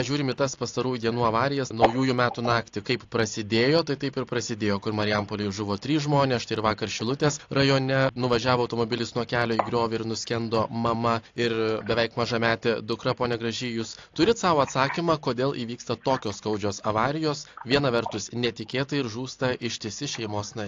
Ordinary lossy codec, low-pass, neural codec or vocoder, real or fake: AAC, 48 kbps; 7.2 kHz; none; real